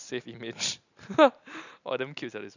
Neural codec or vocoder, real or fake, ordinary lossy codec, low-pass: vocoder, 44.1 kHz, 128 mel bands every 512 samples, BigVGAN v2; fake; none; 7.2 kHz